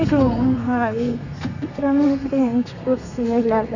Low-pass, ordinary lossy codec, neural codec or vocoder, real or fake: 7.2 kHz; none; codec, 32 kHz, 1.9 kbps, SNAC; fake